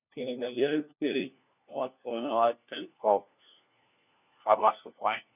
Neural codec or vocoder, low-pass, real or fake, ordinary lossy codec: codec, 16 kHz, 1 kbps, FunCodec, trained on LibriTTS, 50 frames a second; 3.6 kHz; fake; none